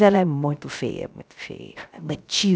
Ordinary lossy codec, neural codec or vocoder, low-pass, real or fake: none; codec, 16 kHz, 0.3 kbps, FocalCodec; none; fake